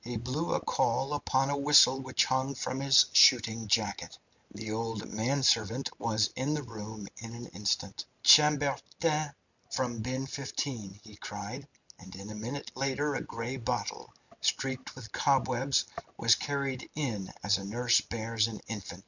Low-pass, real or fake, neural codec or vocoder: 7.2 kHz; real; none